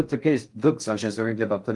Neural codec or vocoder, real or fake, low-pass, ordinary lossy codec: codec, 16 kHz in and 24 kHz out, 0.6 kbps, FocalCodec, streaming, 4096 codes; fake; 10.8 kHz; Opus, 32 kbps